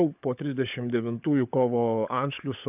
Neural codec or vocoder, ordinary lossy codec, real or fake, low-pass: codec, 16 kHz, 16 kbps, FunCodec, trained on LibriTTS, 50 frames a second; AAC, 32 kbps; fake; 3.6 kHz